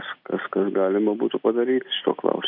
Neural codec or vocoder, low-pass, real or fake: none; 5.4 kHz; real